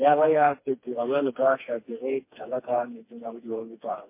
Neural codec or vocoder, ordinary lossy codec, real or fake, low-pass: codec, 16 kHz, 2 kbps, FreqCodec, smaller model; MP3, 24 kbps; fake; 3.6 kHz